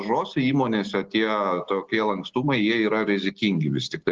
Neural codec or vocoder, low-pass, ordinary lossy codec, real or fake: none; 7.2 kHz; Opus, 16 kbps; real